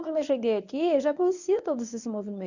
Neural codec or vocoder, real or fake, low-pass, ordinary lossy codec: codec, 24 kHz, 0.9 kbps, WavTokenizer, medium speech release version 1; fake; 7.2 kHz; none